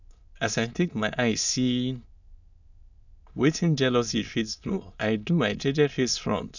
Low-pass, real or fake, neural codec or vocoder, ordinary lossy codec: 7.2 kHz; fake; autoencoder, 22.05 kHz, a latent of 192 numbers a frame, VITS, trained on many speakers; none